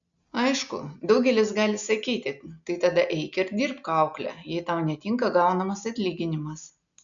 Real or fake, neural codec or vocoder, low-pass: real; none; 7.2 kHz